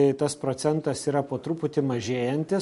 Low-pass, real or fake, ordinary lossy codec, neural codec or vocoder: 14.4 kHz; real; MP3, 48 kbps; none